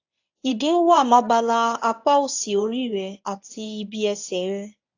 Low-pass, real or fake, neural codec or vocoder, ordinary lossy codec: 7.2 kHz; fake; codec, 24 kHz, 0.9 kbps, WavTokenizer, medium speech release version 1; AAC, 48 kbps